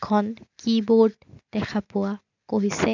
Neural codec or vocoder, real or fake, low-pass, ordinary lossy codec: none; real; 7.2 kHz; none